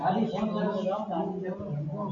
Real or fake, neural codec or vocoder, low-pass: real; none; 7.2 kHz